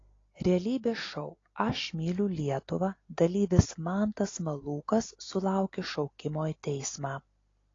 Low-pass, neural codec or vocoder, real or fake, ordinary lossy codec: 7.2 kHz; none; real; AAC, 32 kbps